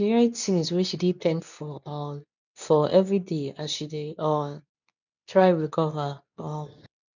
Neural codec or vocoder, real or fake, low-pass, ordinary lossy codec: codec, 24 kHz, 0.9 kbps, WavTokenizer, medium speech release version 2; fake; 7.2 kHz; none